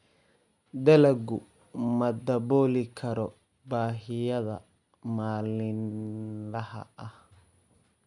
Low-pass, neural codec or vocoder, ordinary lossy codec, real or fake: 10.8 kHz; none; none; real